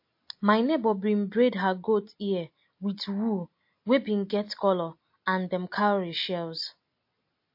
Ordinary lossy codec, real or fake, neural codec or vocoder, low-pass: MP3, 32 kbps; real; none; 5.4 kHz